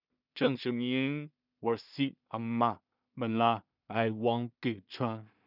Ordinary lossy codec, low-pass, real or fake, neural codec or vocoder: none; 5.4 kHz; fake; codec, 16 kHz in and 24 kHz out, 0.4 kbps, LongCat-Audio-Codec, two codebook decoder